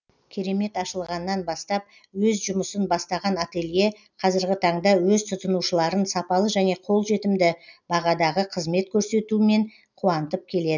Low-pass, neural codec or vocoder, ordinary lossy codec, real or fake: 7.2 kHz; none; none; real